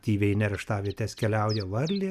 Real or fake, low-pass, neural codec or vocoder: real; 14.4 kHz; none